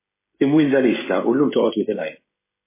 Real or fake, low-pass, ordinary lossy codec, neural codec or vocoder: fake; 3.6 kHz; MP3, 16 kbps; codec, 16 kHz, 16 kbps, FreqCodec, smaller model